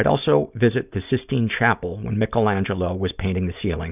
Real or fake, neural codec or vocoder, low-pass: real; none; 3.6 kHz